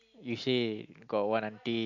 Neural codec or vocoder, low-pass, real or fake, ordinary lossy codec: none; 7.2 kHz; real; AAC, 48 kbps